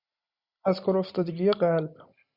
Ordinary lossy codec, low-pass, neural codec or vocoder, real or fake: Opus, 64 kbps; 5.4 kHz; none; real